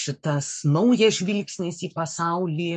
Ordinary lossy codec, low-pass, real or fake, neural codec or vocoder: MP3, 64 kbps; 10.8 kHz; fake; codec, 44.1 kHz, 7.8 kbps, Pupu-Codec